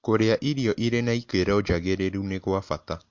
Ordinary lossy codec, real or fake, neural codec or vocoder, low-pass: MP3, 48 kbps; real; none; 7.2 kHz